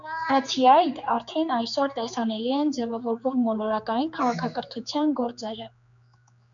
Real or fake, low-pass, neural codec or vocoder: fake; 7.2 kHz; codec, 16 kHz, 4 kbps, X-Codec, HuBERT features, trained on general audio